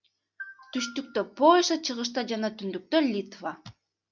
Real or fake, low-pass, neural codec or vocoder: real; 7.2 kHz; none